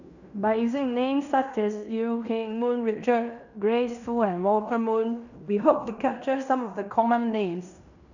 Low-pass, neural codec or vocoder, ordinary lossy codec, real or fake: 7.2 kHz; codec, 16 kHz in and 24 kHz out, 0.9 kbps, LongCat-Audio-Codec, fine tuned four codebook decoder; none; fake